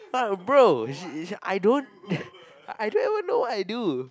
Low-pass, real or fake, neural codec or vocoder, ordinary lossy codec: none; real; none; none